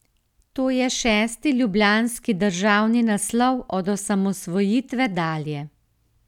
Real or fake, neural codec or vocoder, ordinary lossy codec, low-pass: real; none; none; 19.8 kHz